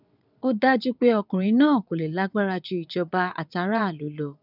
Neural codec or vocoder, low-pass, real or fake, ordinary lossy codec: vocoder, 22.05 kHz, 80 mel bands, WaveNeXt; 5.4 kHz; fake; none